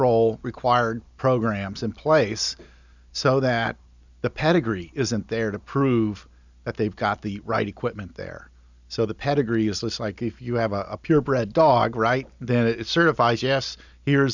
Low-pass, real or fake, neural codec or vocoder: 7.2 kHz; real; none